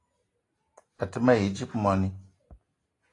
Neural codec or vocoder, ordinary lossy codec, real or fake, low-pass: none; AAC, 32 kbps; real; 10.8 kHz